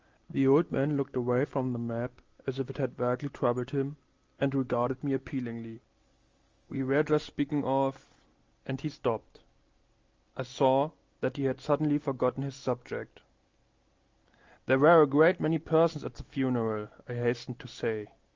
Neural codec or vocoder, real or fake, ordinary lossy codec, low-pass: none; real; Opus, 32 kbps; 7.2 kHz